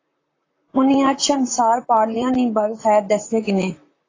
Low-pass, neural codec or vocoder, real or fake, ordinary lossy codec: 7.2 kHz; vocoder, 44.1 kHz, 128 mel bands, Pupu-Vocoder; fake; AAC, 32 kbps